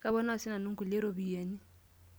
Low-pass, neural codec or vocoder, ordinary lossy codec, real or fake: none; none; none; real